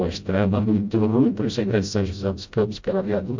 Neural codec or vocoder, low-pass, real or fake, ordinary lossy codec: codec, 16 kHz, 0.5 kbps, FreqCodec, smaller model; 7.2 kHz; fake; MP3, 64 kbps